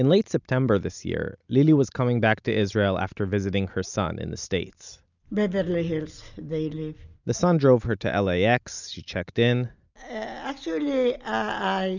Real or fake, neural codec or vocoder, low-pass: real; none; 7.2 kHz